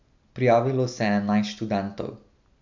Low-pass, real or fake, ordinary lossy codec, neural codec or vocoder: 7.2 kHz; real; MP3, 64 kbps; none